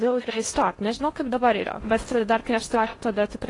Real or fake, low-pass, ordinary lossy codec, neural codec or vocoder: fake; 10.8 kHz; AAC, 32 kbps; codec, 16 kHz in and 24 kHz out, 0.8 kbps, FocalCodec, streaming, 65536 codes